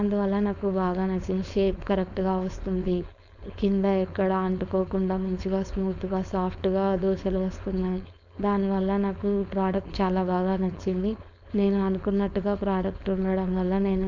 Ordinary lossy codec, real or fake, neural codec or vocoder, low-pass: none; fake; codec, 16 kHz, 4.8 kbps, FACodec; 7.2 kHz